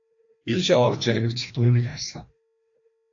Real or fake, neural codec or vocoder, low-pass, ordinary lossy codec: fake; codec, 16 kHz, 1 kbps, FreqCodec, larger model; 7.2 kHz; AAC, 48 kbps